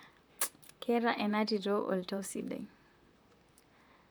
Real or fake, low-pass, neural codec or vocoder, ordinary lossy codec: fake; none; vocoder, 44.1 kHz, 128 mel bands, Pupu-Vocoder; none